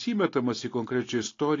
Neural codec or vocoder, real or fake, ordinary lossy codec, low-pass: none; real; AAC, 32 kbps; 7.2 kHz